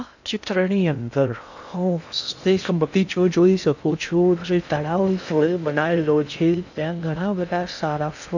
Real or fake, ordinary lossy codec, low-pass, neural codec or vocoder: fake; none; 7.2 kHz; codec, 16 kHz in and 24 kHz out, 0.6 kbps, FocalCodec, streaming, 2048 codes